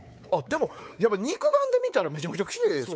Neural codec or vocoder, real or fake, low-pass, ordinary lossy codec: codec, 16 kHz, 4 kbps, X-Codec, WavLM features, trained on Multilingual LibriSpeech; fake; none; none